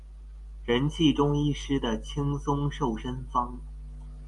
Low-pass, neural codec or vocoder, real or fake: 10.8 kHz; none; real